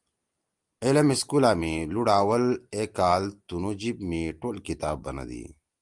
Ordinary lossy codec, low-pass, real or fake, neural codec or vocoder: Opus, 32 kbps; 10.8 kHz; real; none